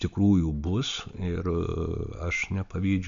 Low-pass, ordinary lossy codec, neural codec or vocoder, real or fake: 7.2 kHz; AAC, 64 kbps; none; real